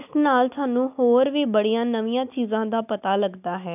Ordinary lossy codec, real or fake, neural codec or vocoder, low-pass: none; real; none; 3.6 kHz